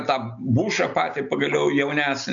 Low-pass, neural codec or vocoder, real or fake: 7.2 kHz; none; real